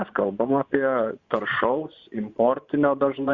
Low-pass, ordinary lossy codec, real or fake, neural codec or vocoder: 7.2 kHz; Opus, 64 kbps; fake; vocoder, 24 kHz, 100 mel bands, Vocos